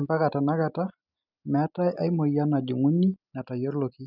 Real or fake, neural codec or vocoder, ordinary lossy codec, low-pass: real; none; none; 5.4 kHz